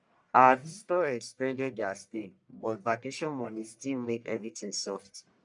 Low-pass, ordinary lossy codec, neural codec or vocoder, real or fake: 10.8 kHz; none; codec, 44.1 kHz, 1.7 kbps, Pupu-Codec; fake